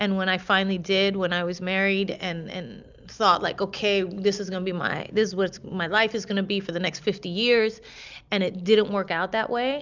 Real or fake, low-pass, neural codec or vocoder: real; 7.2 kHz; none